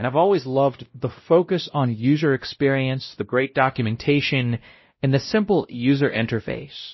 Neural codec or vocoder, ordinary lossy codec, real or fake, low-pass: codec, 16 kHz, 0.5 kbps, X-Codec, WavLM features, trained on Multilingual LibriSpeech; MP3, 24 kbps; fake; 7.2 kHz